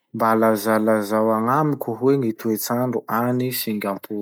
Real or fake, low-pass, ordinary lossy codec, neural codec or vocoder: real; none; none; none